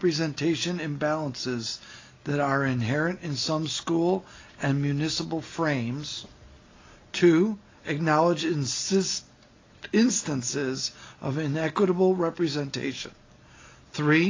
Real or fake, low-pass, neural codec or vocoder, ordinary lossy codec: real; 7.2 kHz; none; AAC, 32 kbps